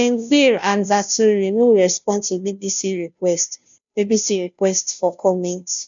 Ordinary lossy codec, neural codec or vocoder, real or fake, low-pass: none; codec, 16 kHz, 0.5 kbps, FunCodec, trained on Chinese and English, 25 frames a second; fake; 7.2 kHz